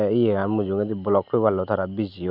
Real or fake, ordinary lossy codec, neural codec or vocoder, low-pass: real; none; none; 5.4 kHz